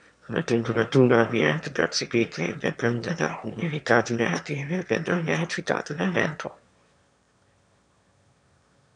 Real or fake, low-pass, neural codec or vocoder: fake; 9.9 kHz; autoencoder, 22.05 kHz, a latent of 192 numbers a frame, VITS, trained on one speaker